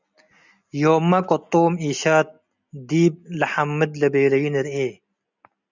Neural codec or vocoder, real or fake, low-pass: none; real; 7.2 kHz